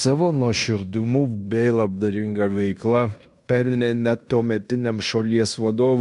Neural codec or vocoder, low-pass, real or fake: codec, 16 kHz in and 24 kHz out, 0.9 kbps, LongCat-Audio-Codec, fine tuned four codebook decoder; 10.8 kHz; fake